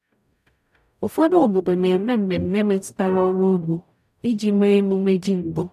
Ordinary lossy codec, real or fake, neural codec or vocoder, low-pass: none; fake; codec, 44.1 kHz, 0.9 kbps, DAC; 14.4 kHz